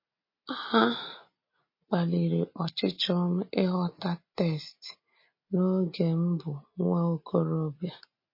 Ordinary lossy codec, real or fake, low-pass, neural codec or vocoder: MP3, 24 kbps; real; 5.4 kHz; none